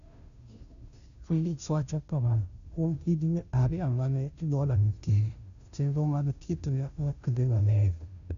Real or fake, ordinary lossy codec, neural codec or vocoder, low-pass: fake; none; codec, 16 kHz, 0.5 kbps, FunCodec, trained on Chinese and English, 25 frames a second; 7.2 kHz